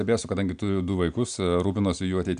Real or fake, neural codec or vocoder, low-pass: real; none; 9.9 kHz